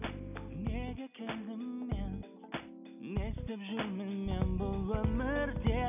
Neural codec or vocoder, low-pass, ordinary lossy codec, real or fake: none; 3.6 kHz; none; real